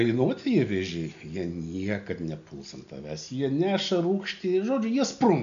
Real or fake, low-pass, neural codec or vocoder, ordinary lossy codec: real; 7.2 kHz; none; AAC, 96 kbps